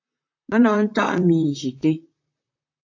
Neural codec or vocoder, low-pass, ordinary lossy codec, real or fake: vocoder, 44.1 kHz, 128 mel bands, Pupu-Vocoder; 7.2 kHz; AAC, 48 kbps; fake